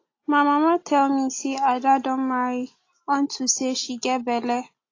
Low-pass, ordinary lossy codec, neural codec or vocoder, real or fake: 7.2 kHz; AAC, 32 kbps; none; real